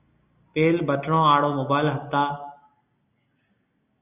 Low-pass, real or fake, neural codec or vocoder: 3.6 kHz; real; none